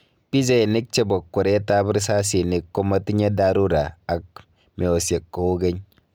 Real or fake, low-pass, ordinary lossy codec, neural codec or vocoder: real; none; none; none